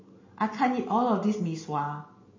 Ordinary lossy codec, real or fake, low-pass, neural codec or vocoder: MP3, 32 kbps; real; 7.2 kHz; none